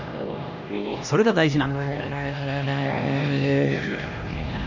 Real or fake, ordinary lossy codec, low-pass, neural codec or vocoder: fake; none; 7.2 kHz; codec, 16 kHz, 1 kbps, X-Codec, HuBERT features, trained on LibriSpeech